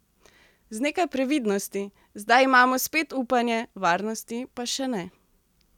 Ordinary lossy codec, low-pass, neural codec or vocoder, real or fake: none; 19.8 kHz; none; real